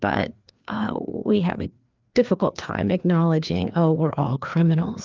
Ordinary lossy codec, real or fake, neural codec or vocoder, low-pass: Opus, 32 kbps; fake; codec, 16 kHz, 2 kbps, FreqCodec, larger model; 7.2 kHz